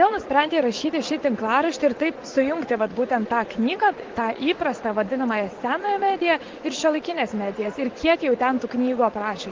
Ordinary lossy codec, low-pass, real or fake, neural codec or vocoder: Opus, 16 kbps; 7.2 kHz; fake; vocoder, 44.1 kHz, 80 mel bands, Vocos